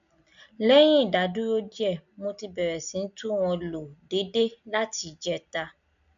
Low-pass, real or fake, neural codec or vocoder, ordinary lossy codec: 7.2 kHz; real; none; none